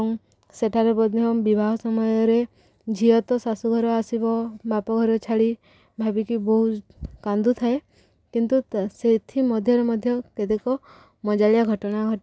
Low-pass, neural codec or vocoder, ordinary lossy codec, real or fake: none; none; none; real